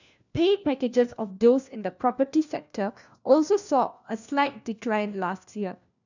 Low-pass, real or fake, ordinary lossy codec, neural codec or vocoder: 7.2 kHz; fake; none; codec, 16 kHz, 1 kbps, FunCodec, trained on LibriTTS, 50 frames a second